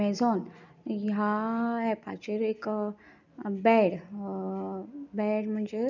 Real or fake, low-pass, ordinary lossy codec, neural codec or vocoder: real; 7.2 kHz; none; none